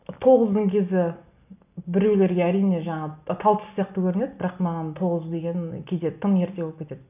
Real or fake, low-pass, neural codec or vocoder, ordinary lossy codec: real; 3.6 kHz; none; none